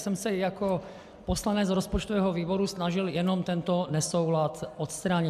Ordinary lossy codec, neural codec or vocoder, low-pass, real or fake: AAC, 96 kbps; none; 14.4 kHz; real